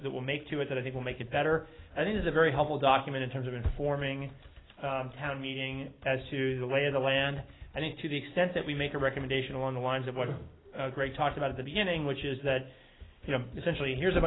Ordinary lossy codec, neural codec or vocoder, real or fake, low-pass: AAC, 16 kbps; none; real; 7.2 kHz